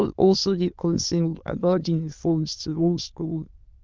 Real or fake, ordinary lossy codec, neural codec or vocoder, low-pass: fake; Opus, 32 kbps; autoencoder, 22.05 kHz, a latent of 192 numbers a frame, VITS, trained on many speakers; 7.2 kHz